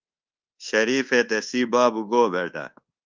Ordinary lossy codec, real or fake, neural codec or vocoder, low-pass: Opus, 24 kbps; fake; codec, 24 kHz, 1.2 kbps, DualCodec; 7.2 kHz